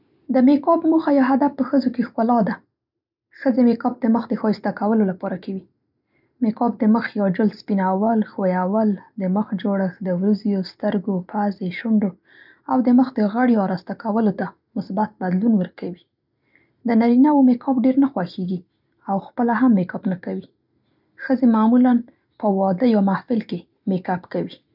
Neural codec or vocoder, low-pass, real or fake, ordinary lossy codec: none; 5.4 kHz; real; none